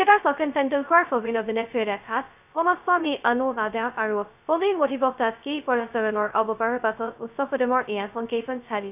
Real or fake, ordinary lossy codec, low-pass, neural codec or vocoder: fake; none; 3.6 kHz; codec, 16 kHz, 0.2 kbps, FocalCodec